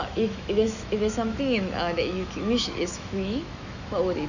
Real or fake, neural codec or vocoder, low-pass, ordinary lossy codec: fake; autoencoder, 48 kHz, 128 numbers a frame, DAC-VAE, trained on Japanese speech; 7.2 kHz; none